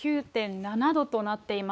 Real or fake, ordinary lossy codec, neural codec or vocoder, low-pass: fake; none; codec, 16 kHz, 4 kbps, X-Codec, WavLM features, trained on Multilingual LibriSpeech; none